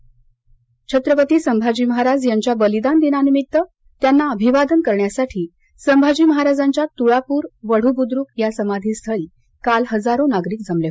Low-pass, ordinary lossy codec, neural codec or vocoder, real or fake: none; none; none; real